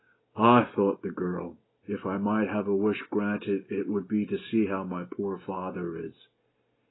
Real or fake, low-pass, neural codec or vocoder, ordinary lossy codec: real; 7.2 kHz; none; AAC, 16 kbps